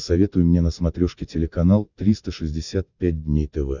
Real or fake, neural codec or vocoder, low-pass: real; none; 7.2 kHz